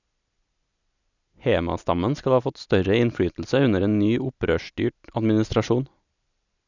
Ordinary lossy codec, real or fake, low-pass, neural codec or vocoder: none; real; 7.2 kHz; none